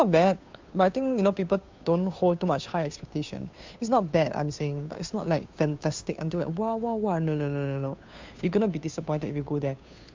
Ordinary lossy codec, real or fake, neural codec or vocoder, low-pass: MP3, 64 kbps; fake; codec, 16 kHz, 2 kbps, FunCodec, trained on Chinese and English, 25 frames a second; 7.2 kHz